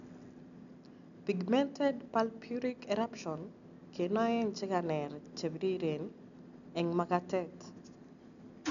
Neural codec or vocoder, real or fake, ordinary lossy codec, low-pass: none; real; none; 7.2 kHz